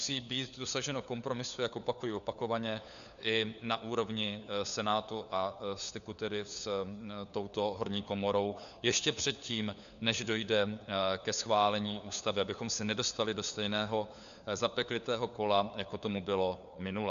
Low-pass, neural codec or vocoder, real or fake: 7.2 kHz; codec, 16 kHz, 4 kbps, FunCodec, trained on LibriTTS, 50 frames a second; fake